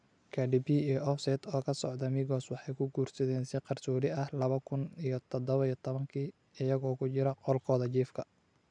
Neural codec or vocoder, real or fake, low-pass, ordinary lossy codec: none; real; 9.9 kHz; none